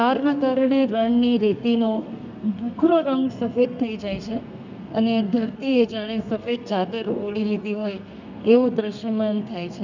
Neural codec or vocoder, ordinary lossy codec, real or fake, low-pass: codec, 32 kHz, 1.9 kbps, SNAC; none; fake; 7.2 kHz